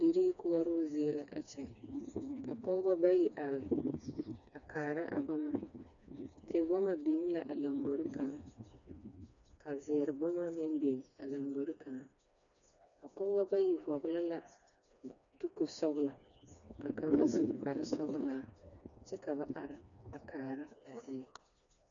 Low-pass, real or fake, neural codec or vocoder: 7.2 kHz; fake; codec, 16 kHz, 2 kbps, FreqCodec, smaller model